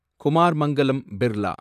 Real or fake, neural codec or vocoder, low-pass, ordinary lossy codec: fake; vocoder, 44.1 kHz, 128 mel bands, Pupu-Vocoder; 14.4 kHz; none